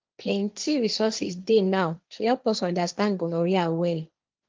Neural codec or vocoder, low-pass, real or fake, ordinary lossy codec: codec, 16 kHz, 1.1 kbps, Voila-Tokenizer; 7.2 kHz; fake; Opus, 24 kbps